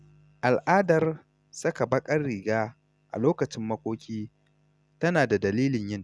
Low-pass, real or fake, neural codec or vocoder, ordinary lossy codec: none; real; none; none